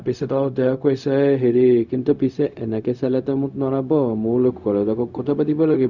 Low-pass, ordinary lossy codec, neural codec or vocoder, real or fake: 7.2 kHz; none; codec, 16 kHz, 0.4 kbps, LongCat-Audio-Codec; fake